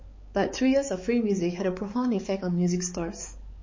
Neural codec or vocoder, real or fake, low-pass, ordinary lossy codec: codec, 16 kHz, 4 kbps, X-Codec, HuBERT features, trained on balanced general audio; fake; 7.2 kHz; MP3, 32 kbps